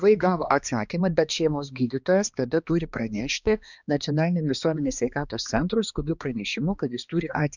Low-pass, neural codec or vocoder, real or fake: 7.2 kHz; codec, 16 kHz, 2 kbps, X-Codec, HuBERT features, trained on balanced general audio; fake